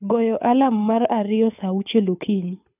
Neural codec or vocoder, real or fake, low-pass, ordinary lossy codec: codec, 24 kHz, 6 kbps, HILCodec; fake; 3.6 kHz; none